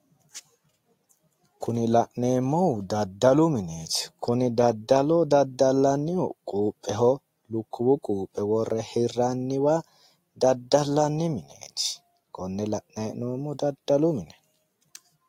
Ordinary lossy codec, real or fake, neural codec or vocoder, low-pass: AAC, 48 kbps; real; none; 19.8 kHz